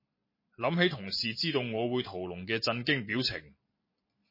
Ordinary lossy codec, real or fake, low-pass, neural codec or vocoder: MP3, 24 kbps; fake; 5.4 kHz; vocoder, 44.1 kHz, 128 mel bands every 256 samples, BigVGAN v2